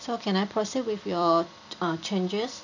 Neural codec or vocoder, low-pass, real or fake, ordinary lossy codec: vocoder, 44.1 kHz, 128 mel bands every 256 samples, BigVGAN v2; 7.2 kHz; fake; none